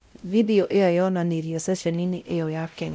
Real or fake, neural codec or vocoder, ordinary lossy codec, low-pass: fake; codec, 16 kHz, 0.5 kbps, X-Codec, WavLM features, trained on Multilingual LibriSpeech; none; none